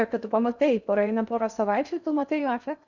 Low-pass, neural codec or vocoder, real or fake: 7.2 kHz; codec, 16 kHz in and 24 kHz out, 0.6 kbps, FocalCodec, streaming, 2048 codes; fake